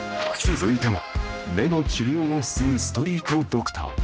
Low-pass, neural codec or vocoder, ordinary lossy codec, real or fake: none; codec, 16 kHz, 1 kbps, X-Codec, HuBERT features, trained on general audio; none; fake